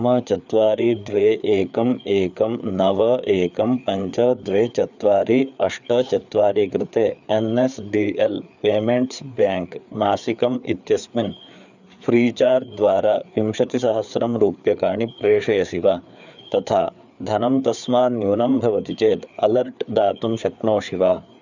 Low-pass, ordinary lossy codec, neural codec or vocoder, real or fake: 7.2 kHz; none; codec, 16 kHz, 4 kbps, FreqCodec, larger model; fake